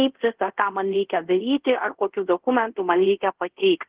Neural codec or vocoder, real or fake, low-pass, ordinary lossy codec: codec, 24 kHz, 0.5 kbps, DualCodec; fake; 3.6 kHz; Opus, 16 kbps